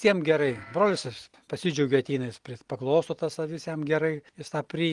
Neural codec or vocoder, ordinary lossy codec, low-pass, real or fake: none; Opus, 24 kbps; 10.8 kHz; real